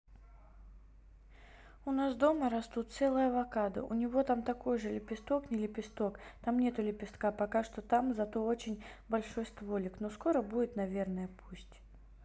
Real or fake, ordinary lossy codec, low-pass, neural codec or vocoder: real; none; none; none